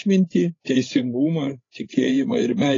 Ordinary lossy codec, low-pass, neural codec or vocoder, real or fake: AAC, 32 kbps; 7.2 kHz; codec, 16 kHz, 8 kbps, FreqCodec, larger model; fake